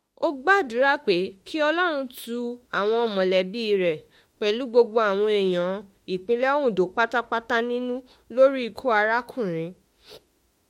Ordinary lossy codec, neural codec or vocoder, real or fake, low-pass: MP3, 64 kbps; autoencoder, 48 kHz, 32 numbers a frame, DAC-VAE, trained on Japanese speech; fake; 19.8 kHz